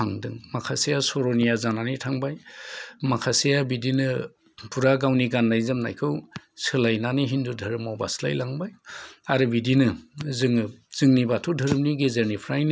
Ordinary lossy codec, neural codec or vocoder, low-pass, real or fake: none; none; none; real